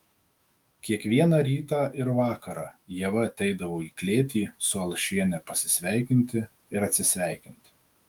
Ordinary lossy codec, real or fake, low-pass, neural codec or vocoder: Opus, 32 kbps; fake; 19.8 kHz; autoencoder, 48 kHz, 128 numbers a frame, DAC-VAE, trained on Japanese speech